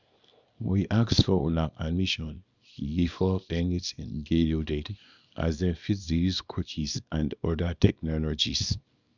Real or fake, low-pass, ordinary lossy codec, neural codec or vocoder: fake; 7.2 kHz; none; codec, 24 kHz, 0.9 kbps, WavTokenizer, small release